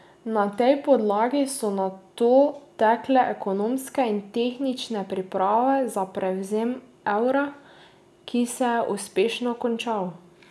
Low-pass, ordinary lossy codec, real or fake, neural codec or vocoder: none; none; real; none